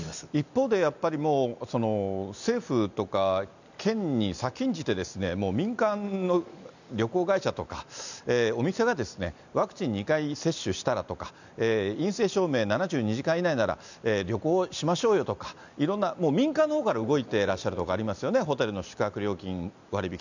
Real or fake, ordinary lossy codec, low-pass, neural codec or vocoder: real; none; 7.2 kHz; none